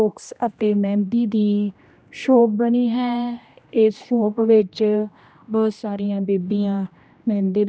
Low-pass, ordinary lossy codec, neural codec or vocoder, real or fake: none; none; codec, 16 kHz, 1 kbps, X-Codec, HuBERT features, trained on general audio; fake